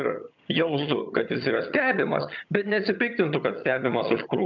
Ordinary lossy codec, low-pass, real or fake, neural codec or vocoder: MP3, 64 kbps; 7.2 kHz; fake; vocoder, 22.05 kHz, 80 mel bands, HiFi-GAN